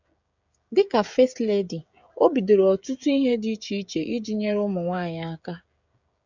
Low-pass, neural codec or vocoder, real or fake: 7.2 kHz; codec, 16 kHz, 16 kbps, FreqCodec, smaller model; fake